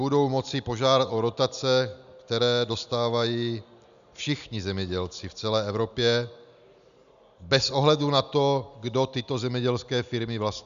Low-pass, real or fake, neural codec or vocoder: 7.2 kHz; real; none